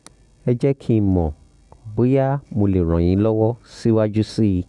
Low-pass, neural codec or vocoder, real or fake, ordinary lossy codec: 10.8 kHz; none; real; none